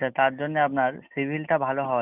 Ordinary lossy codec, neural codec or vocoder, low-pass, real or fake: none; none; 3.6 kHz; real